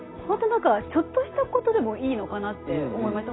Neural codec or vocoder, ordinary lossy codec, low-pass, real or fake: none; AAC, 16 kbps; 7.2 kHz; real